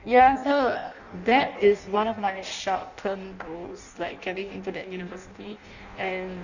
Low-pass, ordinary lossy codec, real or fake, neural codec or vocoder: 7.2 kHz; none; fake; codec, 16 kHz in and 24 kHz out, 0.6 kbps, FireRedTTS-2 codec